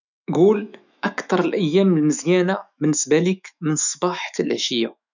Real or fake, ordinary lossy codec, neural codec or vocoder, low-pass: fake; none; autoencoder, 48 kHz, 128 numbers a frame, DAC-VAE, trained on Japanese speech; 7.2 kHz